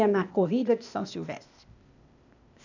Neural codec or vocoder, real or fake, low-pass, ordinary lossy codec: codec, 16 kHz, 0.8 kbps, ZipCodec; fake; 7.2 kHz; none